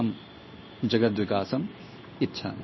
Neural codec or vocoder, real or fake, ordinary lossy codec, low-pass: codec, 16 kHz in and 24 kHz out, 1 kbps, XY-Tokenizer; fake; MP3, 24 kbps; 7.2 kHz